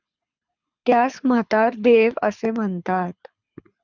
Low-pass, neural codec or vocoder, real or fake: 7.2 kHz; codec, 24 kHz, 6 kbps, HILCodec; fake